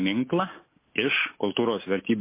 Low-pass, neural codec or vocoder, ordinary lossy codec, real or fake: 3.6 kHz; none; MP3, 24 kbps; real